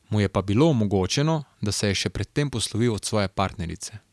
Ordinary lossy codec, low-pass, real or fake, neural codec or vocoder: none; none; real; none